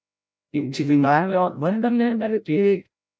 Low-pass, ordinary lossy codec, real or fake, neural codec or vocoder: none; none; fake; codec, 16 kHz, 0.5 kbps, FreqCodec, larger model